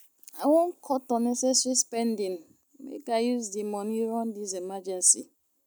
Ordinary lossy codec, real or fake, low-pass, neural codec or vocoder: none; real; none; none